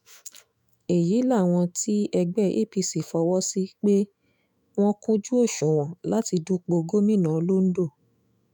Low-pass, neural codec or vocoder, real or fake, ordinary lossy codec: none; autoencoder, 48 kHz, 128 numbers a frame, DAC-VAE, trained on Japanese speech; fake; none